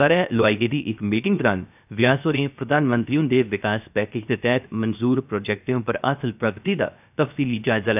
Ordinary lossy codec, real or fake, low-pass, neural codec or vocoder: none; fake; 3.6 kHz; codec, 16 kHz, 0.7 kbps, FocalCodec